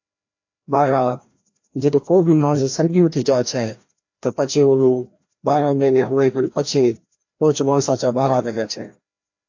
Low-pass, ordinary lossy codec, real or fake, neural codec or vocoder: 7.2 kHz; AAC, 48 kbps; fake; codec, 16 kHz, 1 kbps, FreqCodec, larger model